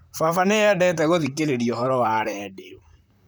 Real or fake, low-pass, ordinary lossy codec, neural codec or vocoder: fake; none; none; vocoder, 44.1 kHz, 128 mel bands, Pupu-Vocoder